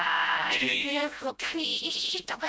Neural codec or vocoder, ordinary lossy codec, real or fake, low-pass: codec, 16 kHz, 0.5 kbps, FreqCodec, smaller model; none; fake; none